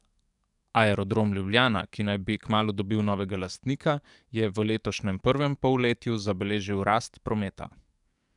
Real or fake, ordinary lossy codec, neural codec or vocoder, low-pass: fake; none; codec, 44.1 kHz, 7.8 kbps, DAC; 10.8 kHz